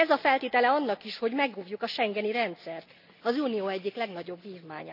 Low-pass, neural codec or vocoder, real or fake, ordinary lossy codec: 5.4 kHz; none; real; none